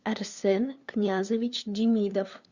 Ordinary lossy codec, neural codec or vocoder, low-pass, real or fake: Opus, 64 kbps; codec, 16 kHz, 2 kbps, FunCodec, trained on LibriTTS, 25 frames a second; 7.2 kHz; fake